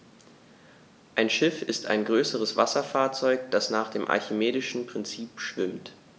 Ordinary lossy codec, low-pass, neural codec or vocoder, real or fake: none; none; none; real